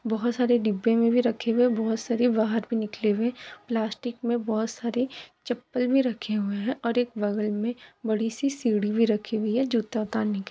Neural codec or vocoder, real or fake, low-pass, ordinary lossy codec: none; real; none; none